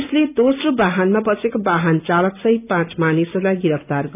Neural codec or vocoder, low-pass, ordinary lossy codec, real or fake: none; 3.6 kHz; none; real